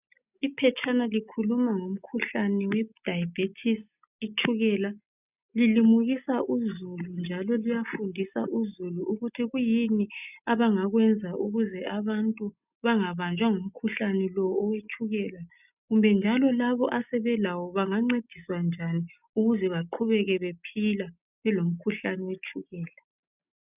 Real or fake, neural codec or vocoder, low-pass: real; none; 3.6 kHz